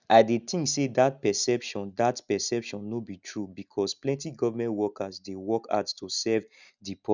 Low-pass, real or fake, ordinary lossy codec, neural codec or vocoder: 7.2 kHz; real; none; none